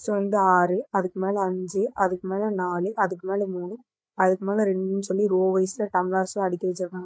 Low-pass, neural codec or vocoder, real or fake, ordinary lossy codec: none; codec, 16 kHz, 8 kbps, FreqCodec, larger model; fake; none